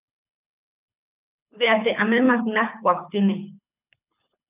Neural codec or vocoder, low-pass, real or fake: codec, 24 kHz, 6 kbps, HILCodec; 3.6 kHz; fake